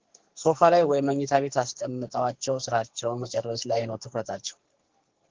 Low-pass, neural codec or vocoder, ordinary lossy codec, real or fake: 7.2 kHz; codec, 44.1 kHz, 2.6 kbps, SNAC; Opus, 16 kbps; fake